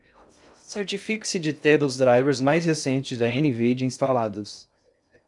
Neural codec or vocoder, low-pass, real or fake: codec, 16 kHz in and 24 kHz out, 0.6 kbps, FocalCodec, streaming, 2048 codes; 10.8 kHz; fake